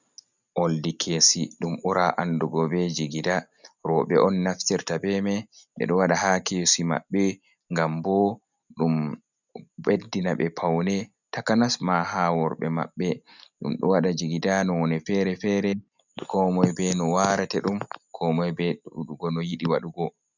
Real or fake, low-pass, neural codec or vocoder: real; 7.2 kHz; none